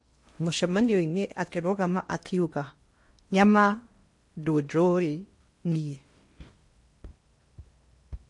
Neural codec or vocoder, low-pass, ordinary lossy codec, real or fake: codec, 16 kHz in and 24 kHz out, 0.8 kbps, FocalCodec, streaming, 65536 codes; 10.8 kHz; MP3, 48 kbps; fake